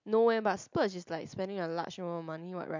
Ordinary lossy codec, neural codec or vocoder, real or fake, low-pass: MP3, 64 kbps; none; real; 7.2 kHz